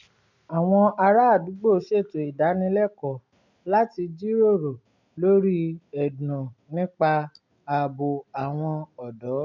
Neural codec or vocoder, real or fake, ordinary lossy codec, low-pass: none; real; none; 7.2 kHz